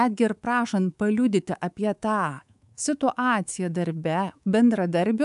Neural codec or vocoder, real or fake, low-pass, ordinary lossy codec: codec, 24 kHz, 3.1 kbps, DualCodec; fake; 10.8 kHz; AAC, 96 kbps